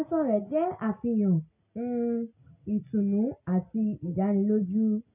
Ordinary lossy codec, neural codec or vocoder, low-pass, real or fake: none; none; 3.6 kHz; real